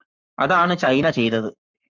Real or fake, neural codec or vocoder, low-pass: fake; codec, 44.1 kHz, 7.8 kbps, Pupu-Codec; 7.2 kHz